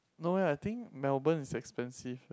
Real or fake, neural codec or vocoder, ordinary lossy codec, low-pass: real; none; none; none